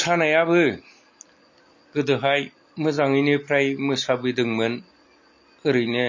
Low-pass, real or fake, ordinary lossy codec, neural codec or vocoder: 7.2 kHz; real; MP3, 32 kbps; none